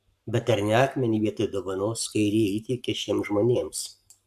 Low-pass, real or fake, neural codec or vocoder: 14.4 kHz; fake; codec, 44.1 kHz, 7.8 kbps, Pupu-Codec